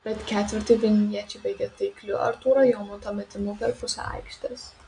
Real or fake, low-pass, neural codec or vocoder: real; 9.9 kHz; none